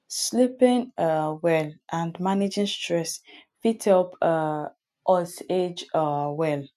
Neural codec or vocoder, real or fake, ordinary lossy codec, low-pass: none; real; none; 14.4 kHz